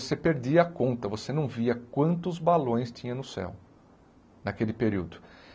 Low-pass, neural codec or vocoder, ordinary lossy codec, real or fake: none; none; none; real